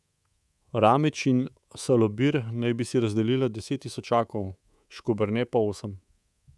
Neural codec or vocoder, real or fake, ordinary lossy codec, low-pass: codec, 24 kHz, 3.1 kbps, DualCodec; fake; none; none